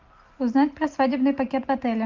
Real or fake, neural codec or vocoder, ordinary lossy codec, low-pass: real; none; Opus, 32 kbps; 7.2 kHz